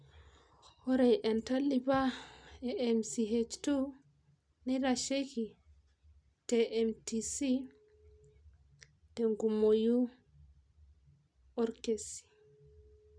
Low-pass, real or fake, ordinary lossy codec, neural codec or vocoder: 9.9 kHz; real; none; none